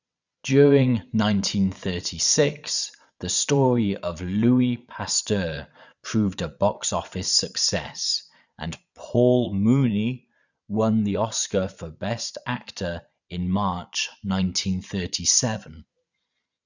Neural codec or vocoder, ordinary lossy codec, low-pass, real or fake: vocoder, 22.05 kHz, 80 mel bands, Vocos; none; 7.2 kHz; fake